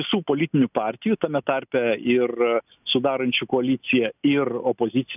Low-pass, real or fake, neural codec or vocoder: 3.6 kHz; real; none